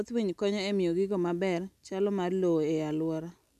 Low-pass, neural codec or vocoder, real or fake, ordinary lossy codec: 14.4 kHz; none; real; none